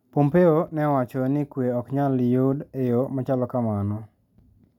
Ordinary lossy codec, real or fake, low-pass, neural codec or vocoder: none; real; 19.8 kHz; none